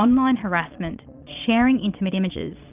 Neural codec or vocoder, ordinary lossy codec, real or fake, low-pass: vocoder, 22.05 kHz, 80 mel bands, Vocos; Opus, 24 kbps; fake; 3.6 kHz